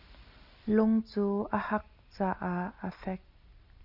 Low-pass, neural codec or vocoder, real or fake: 5.4 kHz; none; real